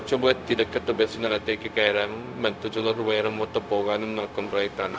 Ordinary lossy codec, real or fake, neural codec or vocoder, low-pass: none; fake; codec, 16 kHz, 0.4 kbps, LongCat-Audio-Codec; none